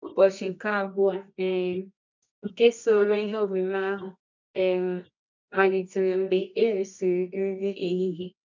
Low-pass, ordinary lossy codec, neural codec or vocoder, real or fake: 7.2 kHz; MP3, 64 kbps; codec, 24 kHz, 0.9 kbps, WavTokenizer, medium music audio release; fake